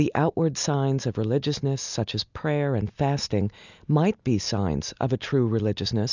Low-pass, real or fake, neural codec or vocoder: 7.2 kHz; real; none